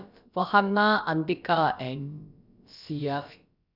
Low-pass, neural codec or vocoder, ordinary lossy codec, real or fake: 5.4 kHz; codec, 16 kHz, about 1 kbps, DyCAST, with the encoder's durations; none; fake